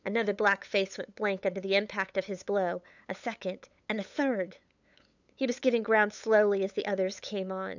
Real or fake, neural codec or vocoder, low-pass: fake; codec, 16 kHz, 8 kbps, FunCodec, trained on LibriTTS, 25 frames a second; 7.2 kHz